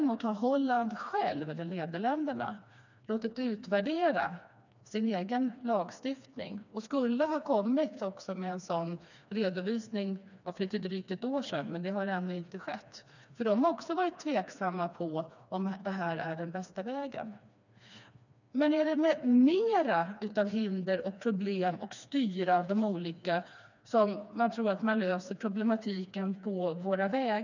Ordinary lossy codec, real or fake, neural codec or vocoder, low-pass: none; fake; codec, 16 kHz, 2 kbps, FreqCodec, smaller model; 7.2 kHz